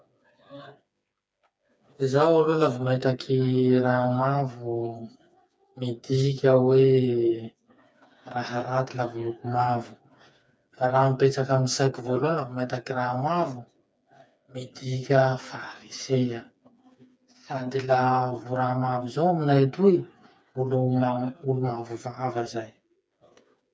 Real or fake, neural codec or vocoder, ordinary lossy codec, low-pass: fake; codec, 16 kHz, 4 kbps, FreqCodec, smaller model; none; none